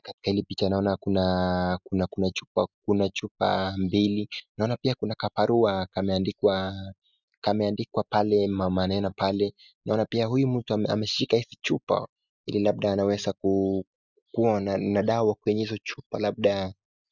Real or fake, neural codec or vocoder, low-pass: real; none; 7.2 kHz